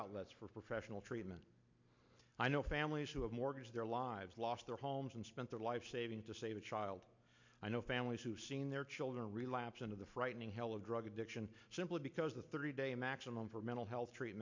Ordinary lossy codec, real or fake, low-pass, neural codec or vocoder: MP3, 64 kbps; real; 7.2 kHz; none